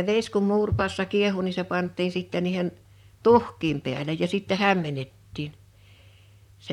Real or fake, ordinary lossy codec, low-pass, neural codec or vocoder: fake; none; 19.8 kHz; vocoder, 44.1 kHz, 128 mel bands, Pupu-Vocoder